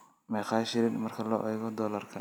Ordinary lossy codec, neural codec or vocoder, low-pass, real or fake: none; none; none; real